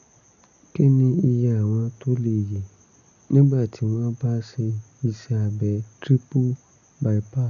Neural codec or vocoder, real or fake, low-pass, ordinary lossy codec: none; real; 7.2 kHz; none